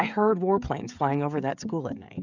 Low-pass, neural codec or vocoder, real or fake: 7.2 kHz; codec, 16 kHz, 16 kbps, FreqCodec, smaller model; fake